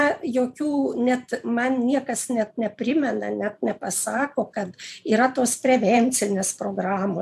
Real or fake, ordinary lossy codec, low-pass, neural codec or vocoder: fake; AAC, 96 kbps; 14.4 kHz; vocoder, 44.1 kHz, 128 mel bands every 512 samples, BigVGAN v2